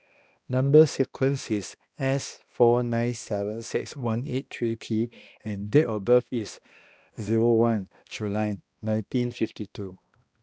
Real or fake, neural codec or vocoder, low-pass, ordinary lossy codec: fake; codec, 16 kHz, 1 kbps, X-Codec, HuBERT features, trained on balanced general audio; none; none